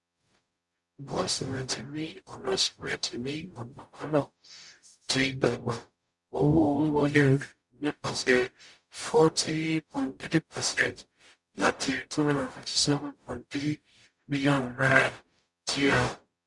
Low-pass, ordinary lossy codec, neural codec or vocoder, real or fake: 10.8 kHz; none; codec, 44.1 kHz, 0.9 kbps, DAC; fake